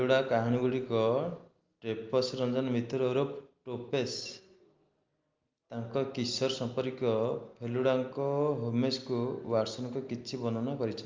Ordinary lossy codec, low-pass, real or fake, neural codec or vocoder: Opus, 32 kbps; 7.2 kHz; real; none